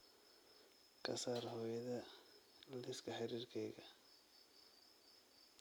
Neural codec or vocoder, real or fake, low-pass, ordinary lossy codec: none; real; none; none